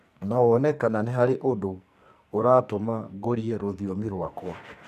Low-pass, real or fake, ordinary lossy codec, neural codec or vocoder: 14.4 kHz; fake; none; codec, 44.1 kHz, 2.6 kbps, SNAC